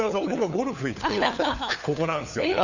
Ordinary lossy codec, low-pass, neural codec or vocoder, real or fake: none; 7.2 kHz; codec, 16 kHz, 4 kbps, FunCodec, trained on LibriTTS, 50 frames a second; fake